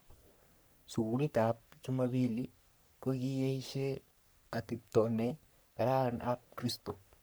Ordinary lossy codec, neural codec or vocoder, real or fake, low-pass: none; codec, 44.1 kHz, 3.4 kbps, Pupu-Codec; fake; none